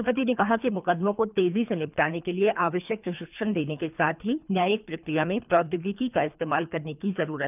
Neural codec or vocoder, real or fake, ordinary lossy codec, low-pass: codec, 24 kHz, 3 kbps, HILCodec; fake; none; 3.6 kHz